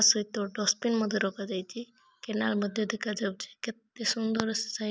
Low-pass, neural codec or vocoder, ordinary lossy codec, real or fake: none; none; none; real